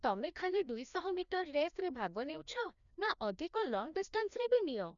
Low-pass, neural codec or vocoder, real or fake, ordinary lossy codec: 7.2 kHz; codec, 16 kHz, 1 kbps, FreqCodec, larger model; fake; none